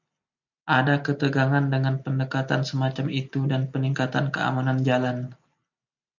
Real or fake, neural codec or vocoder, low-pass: real; none; 7.2 kHz